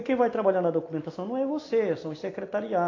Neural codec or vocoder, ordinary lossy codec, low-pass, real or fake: none; AAC, 32 kbps; 7.2 kHz; real